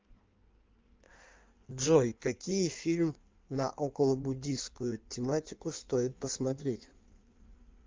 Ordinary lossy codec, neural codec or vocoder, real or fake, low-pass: Opus, 32 kbps; codec, 16 kHz in and 24 kHz out, 1.1 kbps, FireRedTTS-2 codec; fake; 7.2 kHz